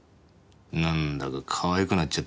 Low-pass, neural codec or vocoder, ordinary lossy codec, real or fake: none; none; none; real